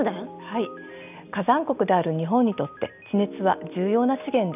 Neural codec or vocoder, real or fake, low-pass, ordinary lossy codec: none; real; 3.6 kHz; none